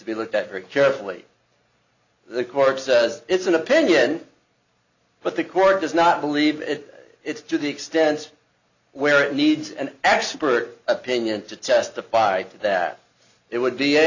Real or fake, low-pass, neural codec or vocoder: real; 7.2 kHz; none